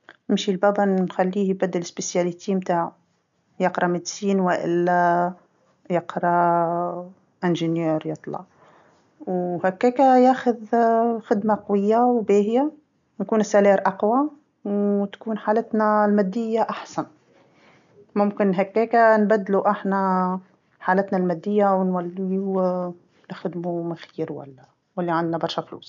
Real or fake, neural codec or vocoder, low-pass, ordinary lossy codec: real; none; 7.2 kHz; none